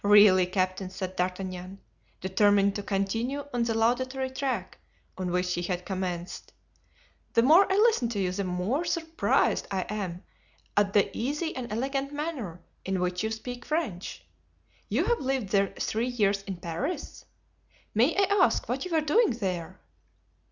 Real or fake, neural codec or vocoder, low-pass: real; none; 7.2 kHz